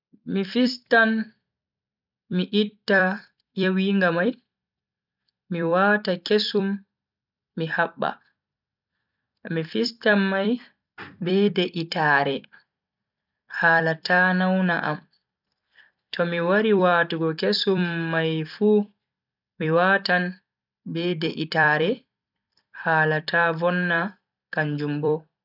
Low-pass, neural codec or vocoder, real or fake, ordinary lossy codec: 5.4 kHz; vocoder, 44.1 kHz, 128 mel bands every 256 samples, BigVGAN v2; fake; none